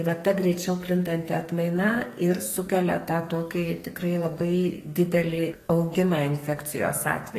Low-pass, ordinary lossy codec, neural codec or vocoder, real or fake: 14.4 kHz; AAC, 48 kbps; codec, 44.1 kHz, 2.6 kbps, SNAC; fake